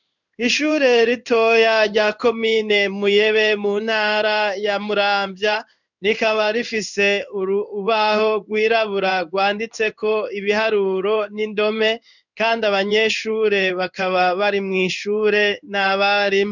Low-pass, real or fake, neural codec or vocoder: 7.2 kHz; fake; codec, 16 kHz in and 24 kHz out, 1 kbps, XY-Tokenizer